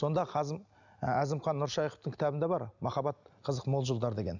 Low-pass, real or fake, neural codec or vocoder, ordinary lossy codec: 7.2 kHz; real; none; none